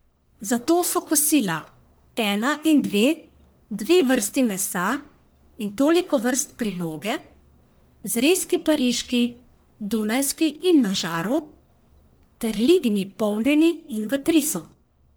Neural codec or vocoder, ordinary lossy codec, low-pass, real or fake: codec, 44.1 kHz, 1.7 kbps, Pupu-Codec; none; none; fake